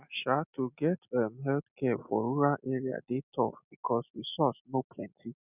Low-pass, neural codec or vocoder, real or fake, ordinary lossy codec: 3.6 kHz; none; real; none